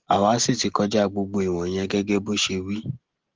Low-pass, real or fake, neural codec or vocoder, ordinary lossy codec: 7.2 kHz; real; none; Opus, 16 kbps